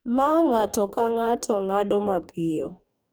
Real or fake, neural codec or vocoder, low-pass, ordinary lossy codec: fake; codec, 44.1 kHz, 2.6 kbps, DAC; none; none